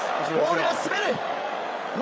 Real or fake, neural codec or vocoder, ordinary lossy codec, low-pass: fake; codec, 16 kHz, 8 kbps, FreqCodec, larger model; none; none